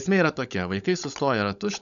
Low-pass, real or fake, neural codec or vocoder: 7.2 kHz; fake; codec, 16 kHz, 16 kbps, FunCodec, trained on LibriTTS, 50 frames a second